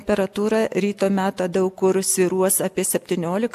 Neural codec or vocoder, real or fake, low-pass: none; real; 14.4 kHz